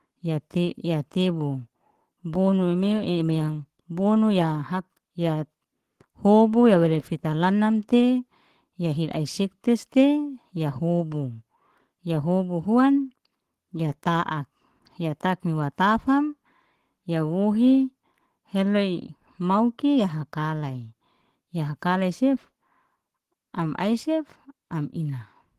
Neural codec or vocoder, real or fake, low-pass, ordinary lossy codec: codec, 44.1 kHz, 7.8 kbps, Pupu-Codec; fake; 14.4 kHz; Opus, 16 kbps